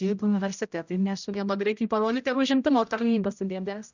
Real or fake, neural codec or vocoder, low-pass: fake; codec, 16 kHz, 0.5 kbps, X-Codec, HuBERT features, trained on general audio; 7.2 kHz